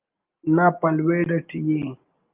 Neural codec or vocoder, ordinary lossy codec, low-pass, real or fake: none; Opus, 24 kbps; 3.6 kHz; real